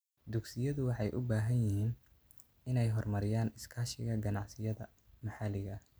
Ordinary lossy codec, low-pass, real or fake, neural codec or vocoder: none; none; real; none